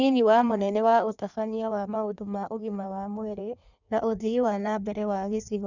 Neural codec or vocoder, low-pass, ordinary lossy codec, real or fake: codec, 16 kHz in and 24 kHz out, 1.1 kbps, FireRedTTS-2 codec; 7.2 kHz; none; fake